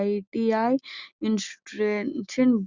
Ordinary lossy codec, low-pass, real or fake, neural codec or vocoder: Opus, 64 kbps; 7.2 kHz; real; none